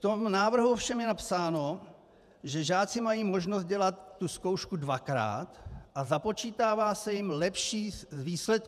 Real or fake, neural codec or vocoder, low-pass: fake; vocoder, 48 kHz, 128 mel bands, Vocos; 14.4 kHz